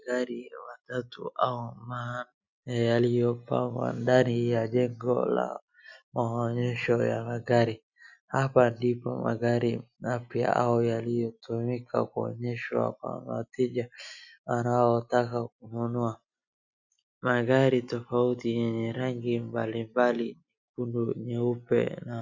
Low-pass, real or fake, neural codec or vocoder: 7.2 kHz; real; none